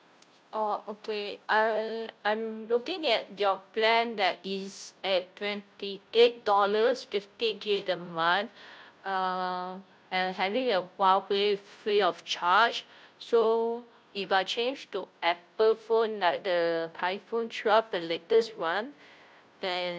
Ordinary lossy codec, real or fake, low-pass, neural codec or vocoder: none; fake; none; codec, 16 kHz, 0.5 kbps, FunCodec, trained on Chinese and English, 25 frames a second